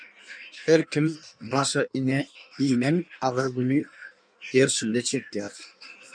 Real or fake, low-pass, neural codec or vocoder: fake; 9.9 kHz; codec, 16 kHz in and 24 kHz out, 1.1 kbps, FireRedTTS-2 codec